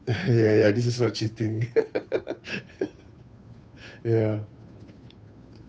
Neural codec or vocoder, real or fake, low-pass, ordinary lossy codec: codec, 16 kHz, 2 kbps, FunCodec, trained on Chinese and English, 25 frames a second; fake; none; none